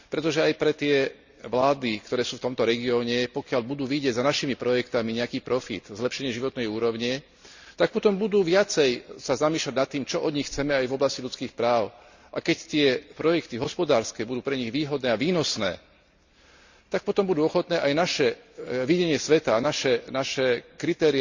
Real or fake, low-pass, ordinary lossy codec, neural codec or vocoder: real; 7.2 kHz; Opus, 64 kbps; none